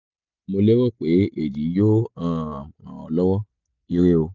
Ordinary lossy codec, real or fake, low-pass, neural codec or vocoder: none; real; 7.2 kHz; none